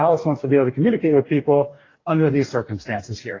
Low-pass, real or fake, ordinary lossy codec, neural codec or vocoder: 7.2 kHz; fake; AAC, 32 kbps; codec, 44.1 kHz, 2.6 kbps, DAC